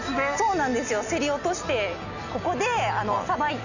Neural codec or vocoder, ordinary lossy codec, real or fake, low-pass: none; none; real; 7.2 kHz